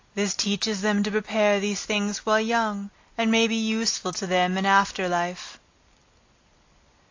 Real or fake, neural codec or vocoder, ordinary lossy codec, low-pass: real; none; AAC, 48 kbps; 7.2 kHz